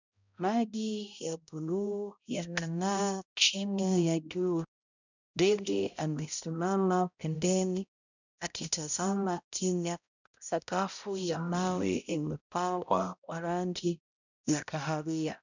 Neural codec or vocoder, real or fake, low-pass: codec, 16 kHz, 0.5 kbps, X-Codec, HuBERT features, trained on balanced general audio; fake; 7.2 kHz